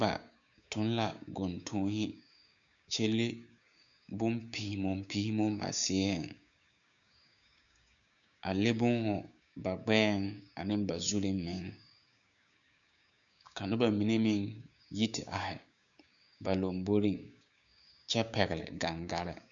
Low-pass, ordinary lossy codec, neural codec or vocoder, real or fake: 7.2 kHz; MP3, 96 kbps; codec, 16 kHz, 6 kbps, DAC; fake